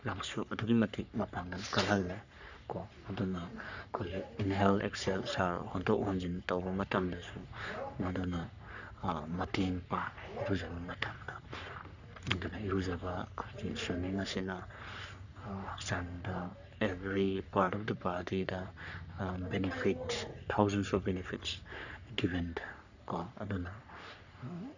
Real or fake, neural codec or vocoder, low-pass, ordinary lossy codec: fake; codec, 44.1 kHz, 3.4 kbps, Pupu-Codec; 7.2 kHz; none